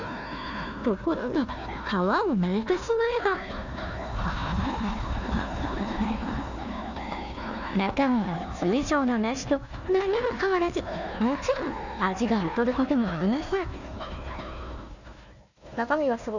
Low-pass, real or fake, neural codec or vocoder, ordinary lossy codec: 7.2 kHz; fake; codec, 16 kHz, 1 kbps, FunCodec, trained on Chinese and English, 50 frames a second; none